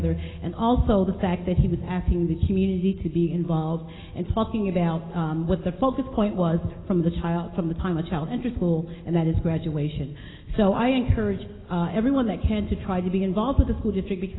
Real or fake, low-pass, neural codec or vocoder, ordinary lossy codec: real; 7.2 kHz; none; AAC, 16 kbps